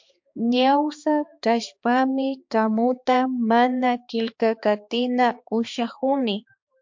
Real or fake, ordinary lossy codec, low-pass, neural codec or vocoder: fake; MP3, 48 kbps; 7.2 kHz; codec, 16 kHz, 2 kbps, X-Codec, HuBERT features, trained on balanced general audio